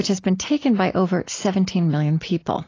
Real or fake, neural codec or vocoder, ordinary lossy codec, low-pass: real; none; AAC, 32 kbps; 7.2 kHz